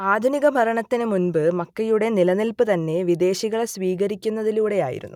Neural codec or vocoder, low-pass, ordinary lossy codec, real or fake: none; 19.8 kHz; none; real